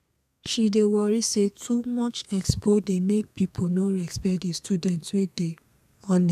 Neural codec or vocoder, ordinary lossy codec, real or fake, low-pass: codec, 32 kHz, 1.9 kbps, SNAC; none; fake; 14.4 kHz